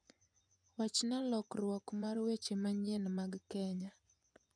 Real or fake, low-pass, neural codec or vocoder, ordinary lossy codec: fake; 9.9 kHz; vocoder, 24 kHz, 100 mel bands, Vocos; none